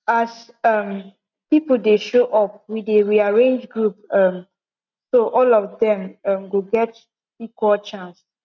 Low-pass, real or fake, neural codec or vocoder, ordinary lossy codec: 7.2 kHz; real; none; none